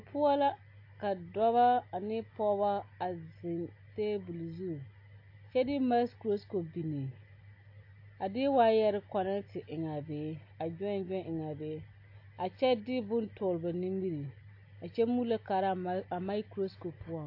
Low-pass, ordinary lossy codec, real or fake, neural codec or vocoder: 5.4 kHz; AAC, 32 kbps; real; none